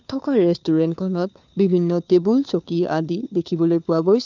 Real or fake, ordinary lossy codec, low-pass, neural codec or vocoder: fake; none; 7.2 kHz; codec, 16 kHz, 2 kbps, FunCodec, trained on Chinese and English, 25 frames a second